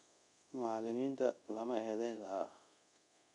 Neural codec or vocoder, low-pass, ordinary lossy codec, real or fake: codec, 24 kHz, 0.5 kbps, DualCodec; 10.8 kHz; none; fake